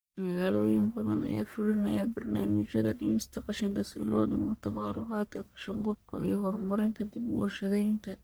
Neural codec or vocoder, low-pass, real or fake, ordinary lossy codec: codec, 44.1 kHz, 1.7 kbps, Pupu-Codec; none; fake; none